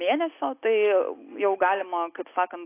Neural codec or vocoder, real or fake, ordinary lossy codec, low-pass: none; real; AAC, 24 kbps; 3.6 kHz